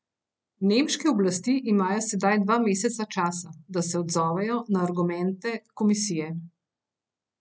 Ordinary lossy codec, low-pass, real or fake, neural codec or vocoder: none; none; real; none